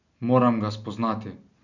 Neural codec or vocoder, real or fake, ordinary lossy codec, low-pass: none; real; none; 7.2 kHz